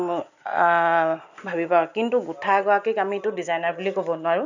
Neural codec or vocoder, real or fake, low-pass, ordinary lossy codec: none; real; 7.2 kHz; none